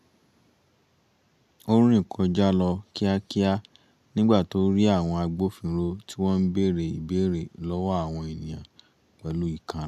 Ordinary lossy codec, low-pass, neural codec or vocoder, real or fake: none; 14.4 kHz; none; real